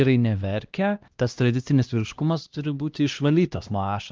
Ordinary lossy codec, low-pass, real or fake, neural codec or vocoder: Opus, 32 kbps; 7.2 kHz; fake; codec, 16 kHz, 1 kbps, X-Codec, HuBERT features, trained on LibriSpeech